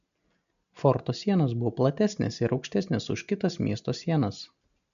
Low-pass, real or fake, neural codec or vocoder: 7.2 kHz; real; none